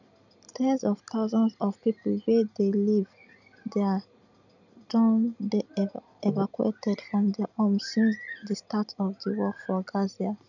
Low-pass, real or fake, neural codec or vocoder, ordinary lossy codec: 7.2 kHz; real; none; none